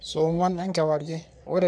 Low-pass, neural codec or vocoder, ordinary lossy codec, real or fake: 9.9 kHz; codec, 44.1 kHz, 3.4 kbps, Pupu-Codec; none; fake